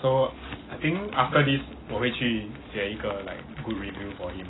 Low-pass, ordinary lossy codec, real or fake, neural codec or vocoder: 7.2 kHz; AAC, 16 kbps; real; none